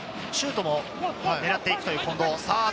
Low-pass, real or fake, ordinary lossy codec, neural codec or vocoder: none; real; none; none